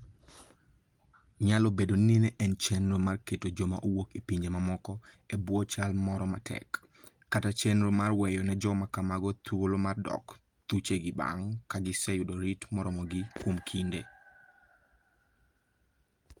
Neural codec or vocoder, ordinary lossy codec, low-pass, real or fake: none; Opus, 32 kbps; 19.8 kHz; real